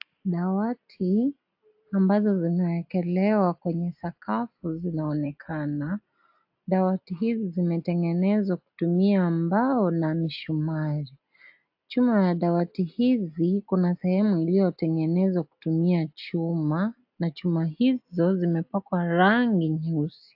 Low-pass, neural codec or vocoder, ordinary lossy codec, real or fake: 5.4 kHz; none; AAC, 48 kbps; real